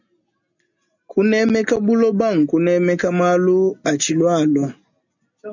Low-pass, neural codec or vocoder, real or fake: 7.2 kHz; none; real